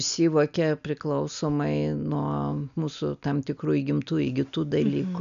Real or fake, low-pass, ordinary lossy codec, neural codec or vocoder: real; 7.2 kHz; MP3, 96 kbps; none